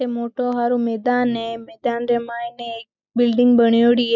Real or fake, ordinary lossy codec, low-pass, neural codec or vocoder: real; none; 7.2 kHz; none